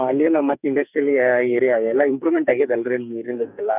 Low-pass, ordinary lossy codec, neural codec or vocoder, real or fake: 3.6 kHz; none; codec, 44.1 kHz, 2.6 kbps, SNAC; fake